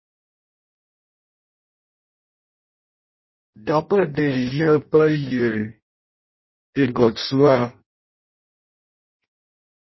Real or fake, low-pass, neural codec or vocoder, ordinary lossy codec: fake; 7.2 kHz; codec, 16 kHz in and 24 kHz out, 0.6 kbps, FireRedTTS-2 codec; MP3, 24 kbps